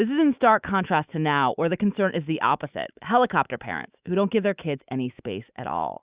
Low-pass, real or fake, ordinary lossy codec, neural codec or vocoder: 3.6 kHz; fake; Opus, 64 kbps; autoencoder, 48 kHz, 128 numbers a frame, DAC-VAE, trained on Japanese speech